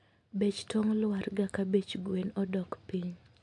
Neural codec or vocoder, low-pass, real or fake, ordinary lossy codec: none; 10.8 kHz; real; MP3, 64 kbps